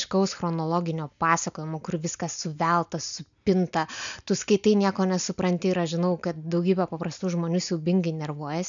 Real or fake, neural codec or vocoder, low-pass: real; none; 7.2 kHz